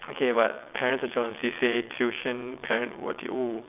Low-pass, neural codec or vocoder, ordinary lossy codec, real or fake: 3.6 kHz; vocoder, 22.05 kHz, 80 mel bands, WaveNeXt; none; fake